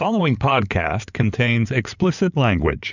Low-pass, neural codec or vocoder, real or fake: 7.2 kHz; codec, 16 kHz in and 24 kHz out, 2.2 kbps, FireRedTTS-2 codec; fake